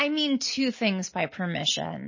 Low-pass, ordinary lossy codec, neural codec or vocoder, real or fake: 7.2 kHz; MP3, 32 kbps; none; real